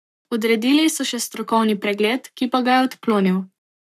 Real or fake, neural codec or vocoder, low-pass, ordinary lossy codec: fake; codec, 44.1 kHz, 7.8 kbps, Pupu-Codec; 14.4 kHz; none